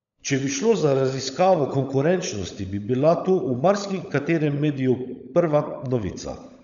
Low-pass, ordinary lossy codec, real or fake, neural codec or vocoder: 7.2 kHz; MP3, 96 kbps; fake; codec, 16 kHz, 16 kbps, FunCodec, trained on LibriTTS, 50 frames a second